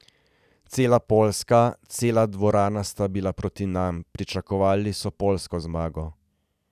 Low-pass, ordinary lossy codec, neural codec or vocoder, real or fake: 14.4 kHz; none; none; real